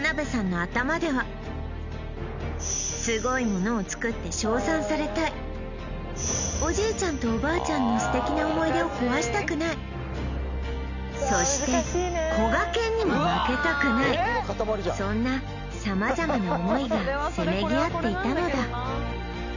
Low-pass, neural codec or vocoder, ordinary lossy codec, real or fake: 7.2 kHz; none; none; real